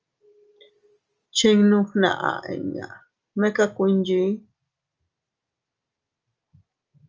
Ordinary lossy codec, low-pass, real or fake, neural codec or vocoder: Opus, 24 kbps; 7.2 kHz; real; none